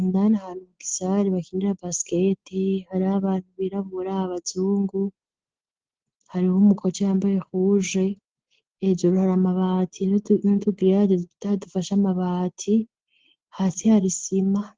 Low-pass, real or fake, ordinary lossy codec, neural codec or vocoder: 7.2 kHz; real; Opus, 16 kbps; none